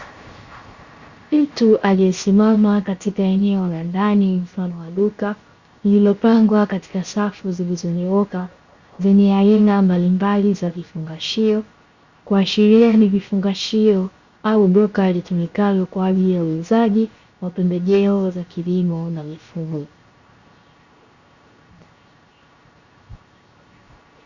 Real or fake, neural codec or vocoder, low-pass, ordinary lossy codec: fake; codec, 16 kHz, 0.7 kbps, FocalCodec; 7.2 kHz; Opus, 64 kbps